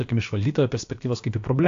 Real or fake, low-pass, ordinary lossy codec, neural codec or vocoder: fake; 7.2 kHz; AAC, 48 kbps; codec, 16 kHz, about 1 kbps, DyCAST, with the encoder's durations